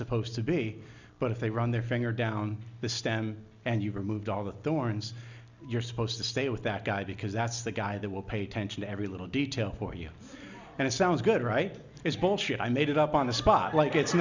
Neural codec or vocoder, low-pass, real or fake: none; 7.2 kHz; real